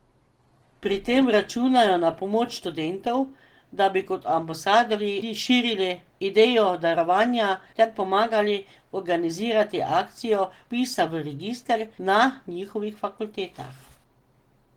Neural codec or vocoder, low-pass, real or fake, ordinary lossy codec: none; 19.8 kHz; real; Opus, 16 kbps